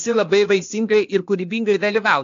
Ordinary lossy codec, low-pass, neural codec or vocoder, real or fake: AAC, 48 kbps; 7.2 kHz; codec, 16 kHz, 0.8 kbps, ZipCodec; fake